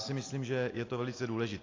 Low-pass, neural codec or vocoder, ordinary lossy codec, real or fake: 7.2 kHz; none; AAC, 32 kbps; real